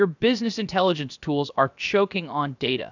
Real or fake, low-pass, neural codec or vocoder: fake; 7.2 kHz; codec, 16 kHz, about 1 kbps, DyCAST, with the encoder's durations